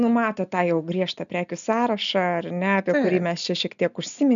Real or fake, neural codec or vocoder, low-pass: real; none; 7.2 kHz